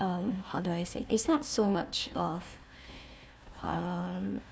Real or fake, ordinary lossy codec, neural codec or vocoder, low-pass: fake; none; codec, 16 kHz, 1 kbps, FunCodec, trained on Chinese and English, 50 frames a second; none